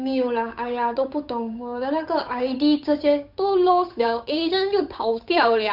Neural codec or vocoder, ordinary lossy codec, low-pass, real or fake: codec, 16 kHz, 8 kbps, FunCodec, trained on Chinese and English, 25 frames a second; none; 5.4 kHz; fake